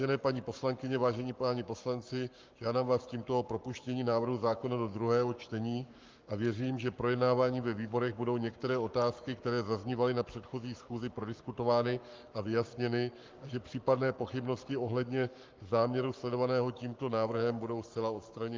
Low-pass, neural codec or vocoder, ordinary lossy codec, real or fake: 7.2 kHz; codec, 44.1 kHz, 7.8 kbps, Pupu-Codec; Opus, 24 kbps; fake